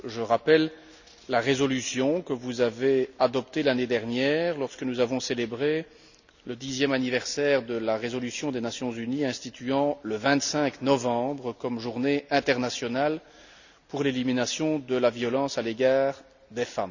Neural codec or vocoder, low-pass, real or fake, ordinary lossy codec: none; 7.2 kHz; real; none